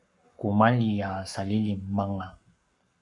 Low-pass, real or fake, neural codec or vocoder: 10.8 kHz; fake; codec, 44.1 kHz, 7.8 kbps, Pupu-Codec